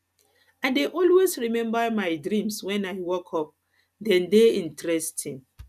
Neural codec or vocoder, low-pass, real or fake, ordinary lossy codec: none; 14.4 kHz; real; none